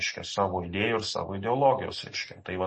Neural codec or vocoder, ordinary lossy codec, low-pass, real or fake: none; MP3, 32 kbps; 10.8 kHz; real